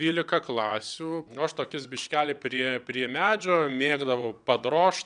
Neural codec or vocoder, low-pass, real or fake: vocoder, 22.05 kHz, 80 mel bands, WaveNeXt; 9.9 kHz; fake